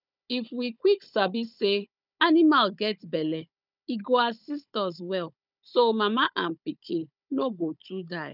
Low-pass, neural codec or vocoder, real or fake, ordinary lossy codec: 5.4 kHz; codec, 16 kHz, 16 kbps, FunCodec, trained on Chinese and English, 50 frames a second; fake; none